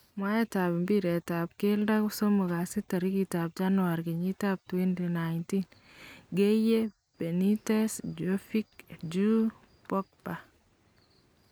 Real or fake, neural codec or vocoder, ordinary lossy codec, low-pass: real; none; none; none